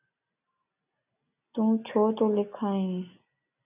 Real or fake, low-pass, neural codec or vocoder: real; 3.6 kHz; none